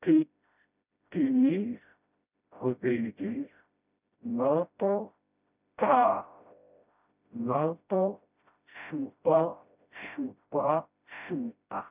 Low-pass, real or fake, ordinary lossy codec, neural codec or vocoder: 3.6 kHz; fake; none; codec, 16 kHz, 0.5 kbps, FreqCodec, smaller model